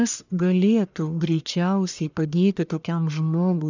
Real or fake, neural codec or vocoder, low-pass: fake; codec, 44.1 kHz, 1.7 kbps, Pupu-Codec; 7.2 kHz